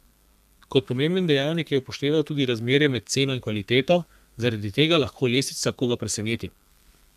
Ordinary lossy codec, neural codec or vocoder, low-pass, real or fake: none; codec, 32 kHz, 1.9 kbps, SNAC; 14.4 kHz; fake